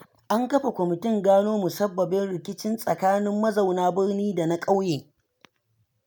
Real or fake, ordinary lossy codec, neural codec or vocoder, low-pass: real; none; none; none